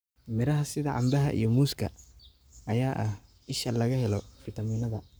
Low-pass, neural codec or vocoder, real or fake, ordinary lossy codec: none; codec, 44.1 kHz, 7.8 kbps, Pupu-Codec; fake; none